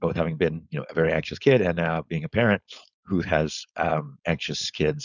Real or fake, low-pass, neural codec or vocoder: fake; 7.2 kHz; codec, 16 kHz, 4.8 kbps, FACodec